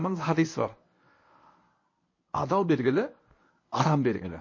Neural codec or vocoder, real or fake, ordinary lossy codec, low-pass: codec, 24 kHz, 0.9 kbps, WavTokenizer, medium speech release version 1; fake; MP3, 32 kbps; 7.2 kHz